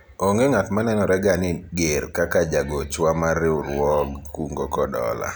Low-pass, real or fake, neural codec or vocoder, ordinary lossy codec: none; real; none; none